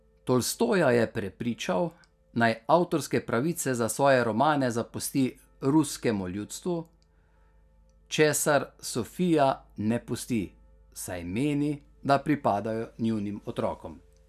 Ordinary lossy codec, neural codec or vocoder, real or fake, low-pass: none; none; real; 14.4 kHz